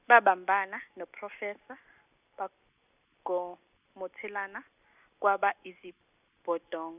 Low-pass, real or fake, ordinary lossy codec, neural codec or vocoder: 3.6 kHz; real; none; none